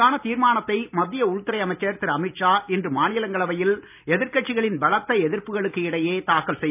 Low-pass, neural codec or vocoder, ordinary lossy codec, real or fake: 3.6 kHz; none; none; real